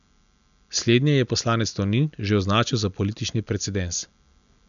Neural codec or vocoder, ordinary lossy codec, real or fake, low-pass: none; none; real; 7.2 kHz